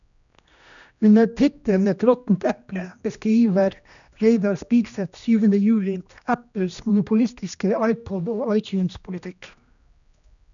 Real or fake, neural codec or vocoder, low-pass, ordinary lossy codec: fake; codec, 16 kHz, 1 kbps, X-Codec, HuBERT features, trained on general audio; 7.2 kHz; none